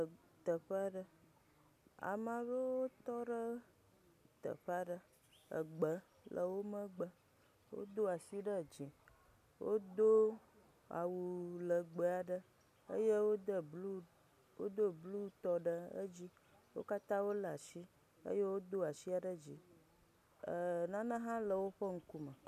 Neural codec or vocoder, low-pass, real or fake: none; 14.4 kHz; real